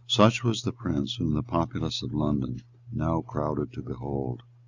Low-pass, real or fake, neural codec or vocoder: 7.2 kHz; real; none